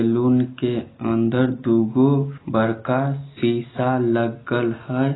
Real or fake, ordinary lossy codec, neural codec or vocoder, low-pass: real; AAC, 16 kbps; none; 7.2 kHz